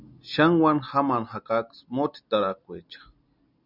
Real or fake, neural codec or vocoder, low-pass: real; none; 5.4 kHz